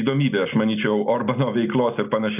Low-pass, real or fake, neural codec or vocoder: 3.6 kHz; real; none